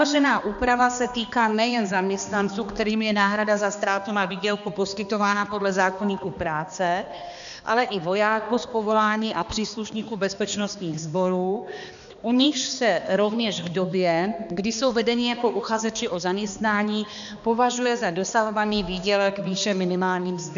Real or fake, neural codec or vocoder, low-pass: fake; codec, 16 kHz, 2 kbps, X-Codec, HuBERT features, trained on balanced general audio; 7.2 kHz